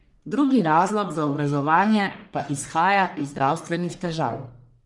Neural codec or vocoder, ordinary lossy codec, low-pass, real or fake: codec, 44.1 kHz, 1.7 kbps, Pupu-Codec; none; 10.8 kHz; fake